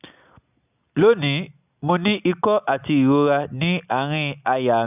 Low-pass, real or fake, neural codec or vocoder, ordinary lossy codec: 3.6 kHz; real; none; none